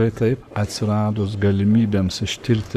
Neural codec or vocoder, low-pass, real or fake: codec, 44.1 kHz, 7.8 kbps, Pupu-Codec; 14.4 kHz; fake